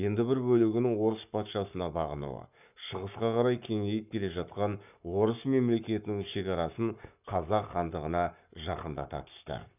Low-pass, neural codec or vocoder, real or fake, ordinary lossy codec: 3.6 kHz; codec, 44.1 kHz, 7.8 kbps, Pupu-Codec; fake; none